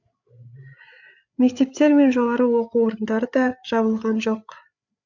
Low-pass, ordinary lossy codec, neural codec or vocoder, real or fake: 7.2 kHz; none; codec, 16 kHz, 8 kbps, FreqCodec, larger model; fake